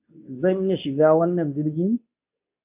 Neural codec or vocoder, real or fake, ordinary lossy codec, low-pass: codec, 24 kHz, 0.9 kbps, WavTokenizer, medium speech release version 2; fake; AAC, 32 kbps; 3.6 kHz